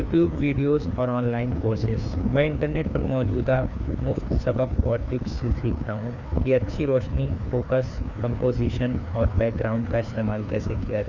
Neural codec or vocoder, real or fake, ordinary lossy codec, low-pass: codec, 16 kHz, 2 kbps, FreqCodec, larger model; fake; none; 7.2 kHz